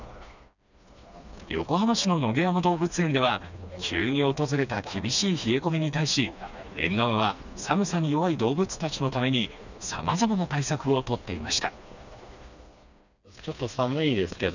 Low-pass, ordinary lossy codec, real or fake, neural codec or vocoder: 7.2 kHz; none; fake; codec, 16 kHz, 2 kbps, FreqCodec, smaller model